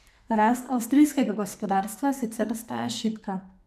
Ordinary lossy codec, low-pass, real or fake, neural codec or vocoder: none; 14.4 kHz; fake; codec, 44.1 kHz, 2.6 kbps, SNAC